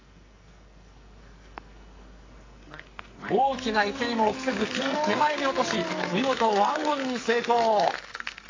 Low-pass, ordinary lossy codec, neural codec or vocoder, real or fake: 7.2 kHz; AAC, 32 kbps; codec, 44.1 kHz, 2.6 kbps, SNAC; fake